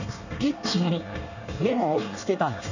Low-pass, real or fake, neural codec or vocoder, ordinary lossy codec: 7.2 kHz; fake; codec, 24 kHz, 1 kbps, SNAC; none